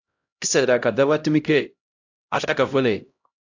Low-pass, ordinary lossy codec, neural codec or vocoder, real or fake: 7.2 kHz; AAC, 48 kbps; codec, 16 kHz, 0.5 kbps, X-Codec, HuBERT features, trained on LibriSpeech; fake